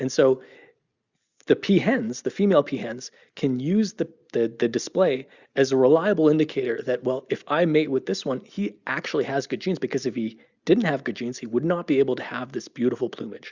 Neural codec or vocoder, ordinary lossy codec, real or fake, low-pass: none; Opus, 64 kbps; real; 7.2 kHz